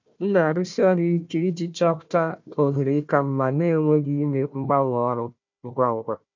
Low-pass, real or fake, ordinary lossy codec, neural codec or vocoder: 7.2 kHz; fake; MP3, 64 kbps; codec, 16 kHz, 1 kbps, FunCodec, trained on Chinese and English, 50 frames a second